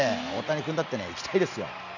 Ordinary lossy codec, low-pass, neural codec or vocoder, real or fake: none; 7.2 kHz; none; real